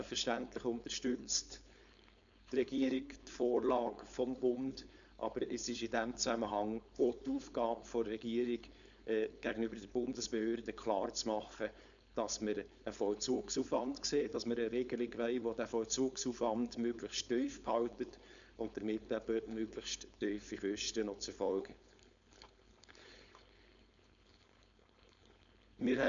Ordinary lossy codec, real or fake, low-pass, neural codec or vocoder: none; fake; 7.2 kHz; codec, 16 kHz, 4.8 kbps, FACodec